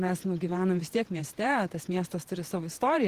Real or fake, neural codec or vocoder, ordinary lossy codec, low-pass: fake; vocoder, 48 kHz, 128 mel bands, Vocos; Opus, 16 kbps; 14.4 kHz